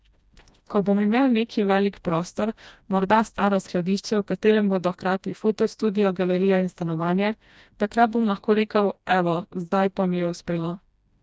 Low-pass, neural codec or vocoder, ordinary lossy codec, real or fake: none; codec, 16 kHz, 1 kbps, FreqCodec, smaller model; none; fake